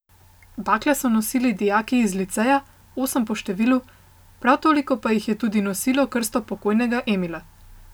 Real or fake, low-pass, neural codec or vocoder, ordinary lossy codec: real; none; none; none